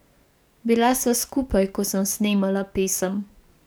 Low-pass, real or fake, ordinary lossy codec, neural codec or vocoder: none; fake; none; codec, 44.1 kHz, 7.8 kbps, DAC